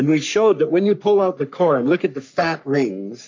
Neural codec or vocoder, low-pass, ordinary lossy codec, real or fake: codec, 44.1 kHz, 3.4 kbps, Pupu-Codec; 7.2 kHz; MP3, 48 kbps; fake